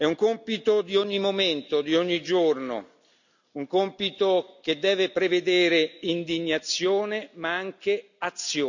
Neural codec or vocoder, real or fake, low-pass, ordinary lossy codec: none; real; 7.2 kHz; none